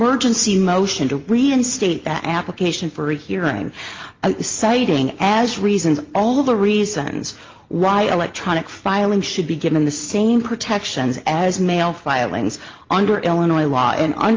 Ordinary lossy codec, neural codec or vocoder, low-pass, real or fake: Opus, 32 kbps; none; 7.2 kHz; real